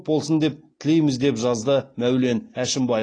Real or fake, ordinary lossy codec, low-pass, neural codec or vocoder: real; AAC, 32 kbps; 9.9 kHz; none